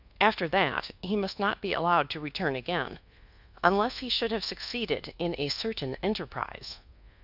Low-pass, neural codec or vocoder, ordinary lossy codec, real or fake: 5.4 kHz; codec, 24 kHz, 1.2 kbps, DualCodec; Opus, 64 kbps; fake